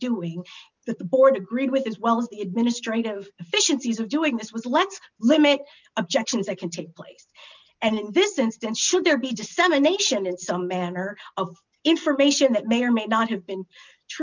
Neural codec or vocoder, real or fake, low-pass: none; real; 7.2 kHz